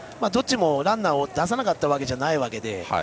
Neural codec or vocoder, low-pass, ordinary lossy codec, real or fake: none; none; none; real